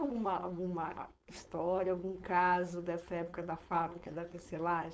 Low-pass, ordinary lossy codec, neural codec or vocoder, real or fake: none; none; codec, 16 kHz, 4.8 kbps, FACodec; fake